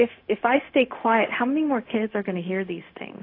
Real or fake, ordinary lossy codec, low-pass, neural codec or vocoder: fake; AAC, 32 kbps; 5.4 kHz; codec, 16 kHz, 0.4 kbps, LongCat-Audio-Codec